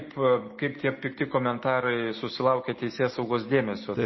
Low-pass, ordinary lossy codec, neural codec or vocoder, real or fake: 7.2 kHz; MP3, 24 kbps; none; real